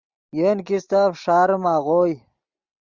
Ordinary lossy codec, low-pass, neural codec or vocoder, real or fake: Opus, 64 kbps; 7.2 kHz; none; real